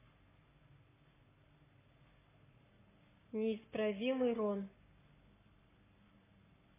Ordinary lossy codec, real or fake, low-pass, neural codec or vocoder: MP3, 16 kbps; fake; 3.6 kHz; codec, 44.1 kHz, 7.8 kbps, Pupu-Codec